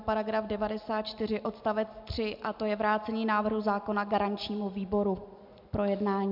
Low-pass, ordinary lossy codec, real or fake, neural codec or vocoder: 5.4 kHz; MP3, 48 kbps; real; none